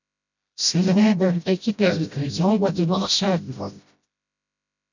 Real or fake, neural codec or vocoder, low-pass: fake; codec, 16 kHz, 0.5 kbps, FreqCodec, smaller model; 7.2 kHz